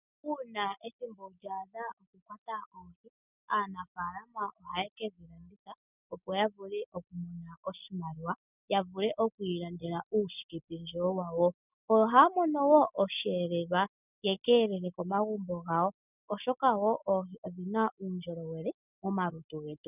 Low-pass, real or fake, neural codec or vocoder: 3.6 kHz; real; none